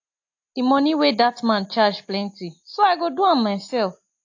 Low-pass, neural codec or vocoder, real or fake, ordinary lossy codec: 7.2 kHz; none; real; AAC, 48 kbps